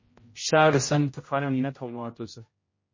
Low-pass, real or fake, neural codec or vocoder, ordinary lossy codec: 7.2 kHz; fake; codec, 16 kHz, 0.5 kbps, X-Codec, HuBERT features, trained on general audio; MP3, 32 kbps